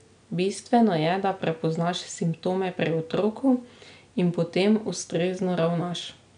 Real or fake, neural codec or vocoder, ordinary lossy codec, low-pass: real; none; none; 9.9 kHz